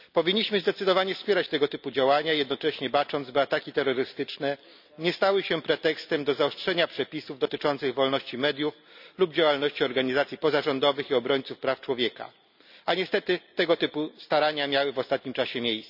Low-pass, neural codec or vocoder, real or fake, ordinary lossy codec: 5.4 kHz; none; real; none